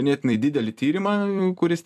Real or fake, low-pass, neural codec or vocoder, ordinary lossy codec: fake; 14.4 kHz; vocoder, 44.1 kHz, 128 mel bands every 256 samples, BigVGAN v2; MP3, 96 kbps